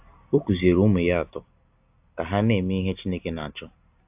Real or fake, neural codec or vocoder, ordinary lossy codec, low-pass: real; none; AAC, 32 kbps; 3.6 kHz